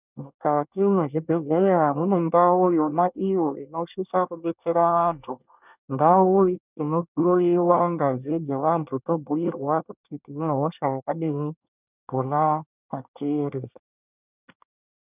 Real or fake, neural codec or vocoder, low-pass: fake; codec, 24 kHz, 1 kbps, SNAC; 3.6 kHz